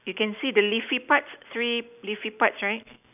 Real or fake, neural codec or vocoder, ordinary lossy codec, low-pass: real; none; none; 3.6 kHz